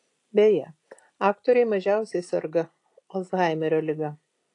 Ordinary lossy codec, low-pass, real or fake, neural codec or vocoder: AAC, 48 kbps; 10.8 kHz; real; none